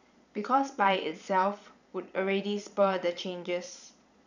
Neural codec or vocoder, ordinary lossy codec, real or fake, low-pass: vocoder, 22.05 kHz, 80 mel bands, Vocos; none; fake; 7.2 kHz